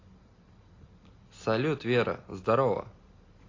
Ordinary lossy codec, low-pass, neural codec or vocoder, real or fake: AAC, 32 kbps; 7.2 kHz; none; real